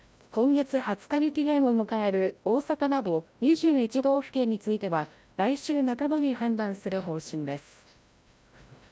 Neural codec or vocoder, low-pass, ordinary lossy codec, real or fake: codec, 16 kHz, 0.5 kbps, FreqCodec, larger model; none; none; fake